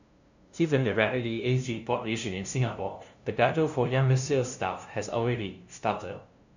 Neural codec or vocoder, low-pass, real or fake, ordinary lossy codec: codec, 16 kHz, 0.5 kbps, FunCodec, trained on LibriTTS, 25 frames a second; 7.2 kHz; fake; none